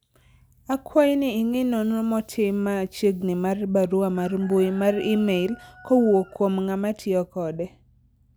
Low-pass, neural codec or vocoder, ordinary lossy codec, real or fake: none; none; none; real